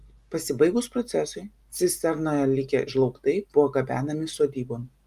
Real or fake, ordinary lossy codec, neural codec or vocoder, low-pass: real; Opus, 32 kbps; none; 14.4 kHz